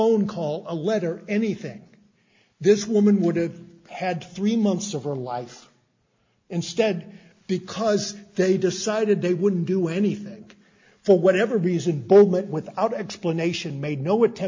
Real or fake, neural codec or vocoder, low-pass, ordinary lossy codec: real; none; 7.2 kHz; MP3, 48 kbps